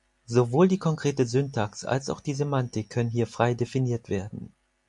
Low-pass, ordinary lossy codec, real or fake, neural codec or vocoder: 10.8 kHz; MP3, 64 kbps; real; none